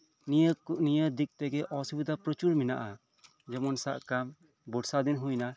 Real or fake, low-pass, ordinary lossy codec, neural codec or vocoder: real; none; none; none